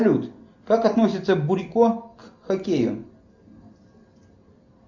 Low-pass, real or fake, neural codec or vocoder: 7.2 kHz; real; none